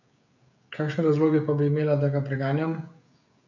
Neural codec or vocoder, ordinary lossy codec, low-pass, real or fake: codec, 16 kHz, 16 kbps, FreqCodec, smaller model; none; 7.2 kHz; fake